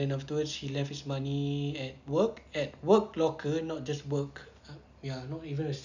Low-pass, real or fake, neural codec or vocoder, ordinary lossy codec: 7.2 kHz; real; none; none